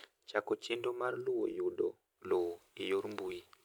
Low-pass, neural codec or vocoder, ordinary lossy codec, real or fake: none; none; none; real